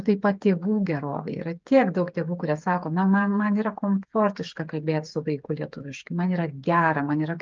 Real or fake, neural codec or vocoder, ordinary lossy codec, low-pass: fake; codec, 16 kHz, 8 kbps, FreqCodec, smaller model; Opus, 24 kbps; 7.2 kHz